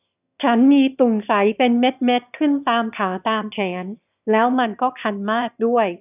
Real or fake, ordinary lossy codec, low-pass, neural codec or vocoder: fake; none; 3.6 kHz; autoencoder, 22.05 kHz, a latent of 192 numbers a frame, VITS, trained on one speaker